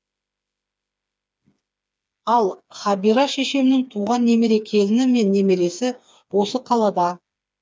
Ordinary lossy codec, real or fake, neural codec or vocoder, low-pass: none; fake; codec, 16 kHz, 4 kbps, FreqCodec, smaller model; none